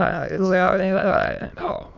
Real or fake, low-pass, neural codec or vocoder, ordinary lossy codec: fake; 7.2 kHz; autoencoder, 22.05 kHz, a latent of 192 numbers a frame, VITS, trained on many speakers; none